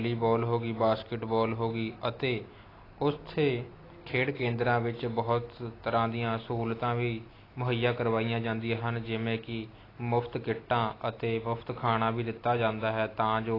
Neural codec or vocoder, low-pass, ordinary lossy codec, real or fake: none; 5.4 kHz; AAC, 24 kbps; real